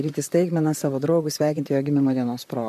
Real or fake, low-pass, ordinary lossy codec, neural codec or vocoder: fake; 14.4 kHz; MP3, 64 kbps; vocoder, 44.1 kHz, 128 mel bands, Pupu-Vocoder